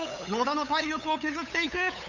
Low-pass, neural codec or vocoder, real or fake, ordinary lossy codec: 7.2 kHz; codec, 16 kHz, 8 kbps, FunCodec, trained on LibriTTS, 25 frames a second; fake; none